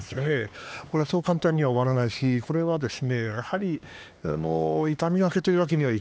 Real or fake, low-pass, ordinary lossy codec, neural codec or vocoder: fake; none; none; codec, 16 kHz, 2 kbps, X-Codec, HuBERT features, trained on LibriSpeech